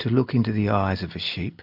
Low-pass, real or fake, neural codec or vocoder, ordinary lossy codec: 5.4 kHz; real; none; AAC, 48 kbps